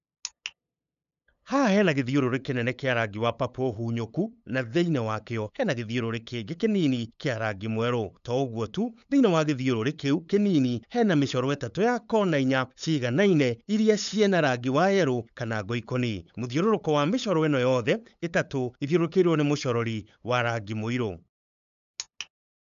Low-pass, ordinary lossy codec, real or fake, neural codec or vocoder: 7.2 kHz; none; fake; codec, 16 kHz, 8 kbps, FunCodec, trained on LibriTTS, 25 frames a second